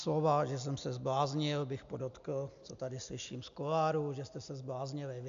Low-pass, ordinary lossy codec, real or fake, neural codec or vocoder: 7.2 kHz; AAC, 64 kbps; real; none